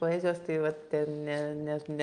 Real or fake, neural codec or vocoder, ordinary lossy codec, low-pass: real; none; Opus, 32 kbps; 9.9 kHz